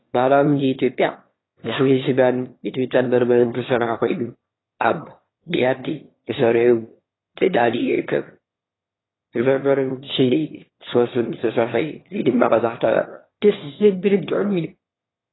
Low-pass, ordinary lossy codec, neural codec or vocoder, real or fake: 7.2 kHz; AAC, 16 kbps; autoencoder, 22.05 kHz, a latent of 192 numbers a frame, VITS, trained on one speaker; fake